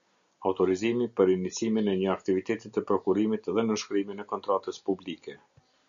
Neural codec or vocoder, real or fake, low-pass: none; real; 7.2 kHz